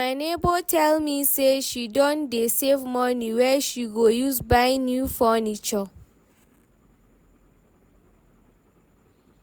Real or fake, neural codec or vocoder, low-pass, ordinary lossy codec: real; none; none; none